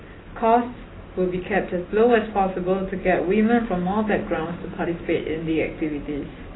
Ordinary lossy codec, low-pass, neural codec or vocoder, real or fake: AAC, 16 kbps; 7.2 kHz; none; real